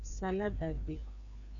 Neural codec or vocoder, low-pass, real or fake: codec, 16 kHz, 2 kbps, FreqCodec, larger model; 7.2 kHz; fake